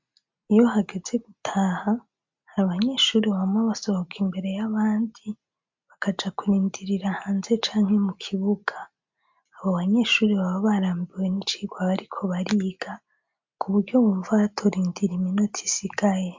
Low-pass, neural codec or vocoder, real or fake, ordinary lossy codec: 7.2 kHz; none; real; MP3, 64 kbps